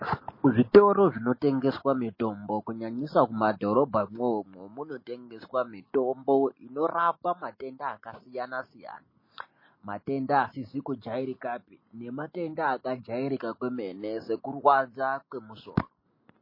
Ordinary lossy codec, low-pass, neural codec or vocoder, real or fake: MP3, 24 kbps; 5.4 kHz; none; real